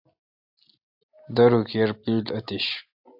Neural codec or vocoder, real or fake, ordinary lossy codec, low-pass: none; real; AAC, 48 kbps; 5.4 kHz